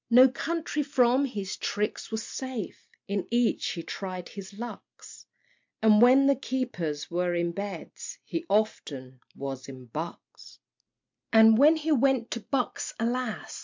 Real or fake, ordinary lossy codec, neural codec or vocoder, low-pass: real; MP3, 64 kbps; none; 7.2 kHz